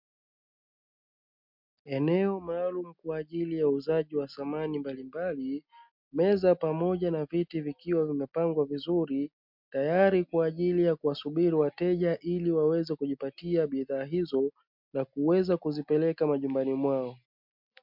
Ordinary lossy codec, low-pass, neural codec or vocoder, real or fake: MP3, 48 kbps; 5.4 kHz; none; real